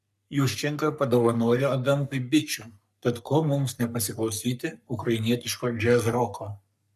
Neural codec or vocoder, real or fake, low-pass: codec, 44.1 kHz, 3.4 kbps, Pupu-Codec; fake; 14.4 kHz